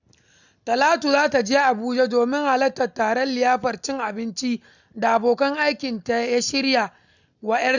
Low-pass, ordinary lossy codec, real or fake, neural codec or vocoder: 7.2 kHz; none; real; none